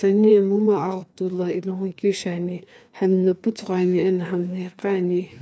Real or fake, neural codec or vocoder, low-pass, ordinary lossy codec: fake; codec, 16 kHz, 2 kbps, FreqCodec, larger model; none; none